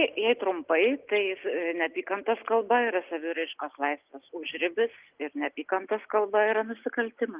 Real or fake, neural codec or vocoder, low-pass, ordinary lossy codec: real; none; 3.6 kHz; Opus, 32 kbps